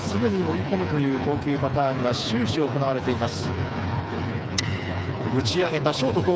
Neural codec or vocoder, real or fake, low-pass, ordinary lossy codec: codec, 16 kHz, 4 kbps, FreqCodec, smaller model; fake; none; none